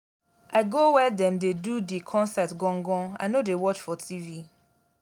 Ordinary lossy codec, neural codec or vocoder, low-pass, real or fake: none; none; 19.8 kHz; real